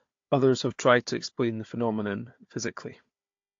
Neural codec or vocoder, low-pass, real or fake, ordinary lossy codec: codec, 16 kHz, 4 kbps, FunCodec, trained on Chinese and English, 50 frames a second; 7.2 kHz; fake; AAC, 48 kbps